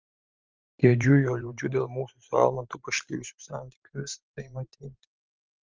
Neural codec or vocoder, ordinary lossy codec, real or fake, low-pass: vocoder, 44.1 kHz, 128 mel bands every 512 samples, BigVGAN v2; Opus, 32 kbps; fake; 7.2 kHz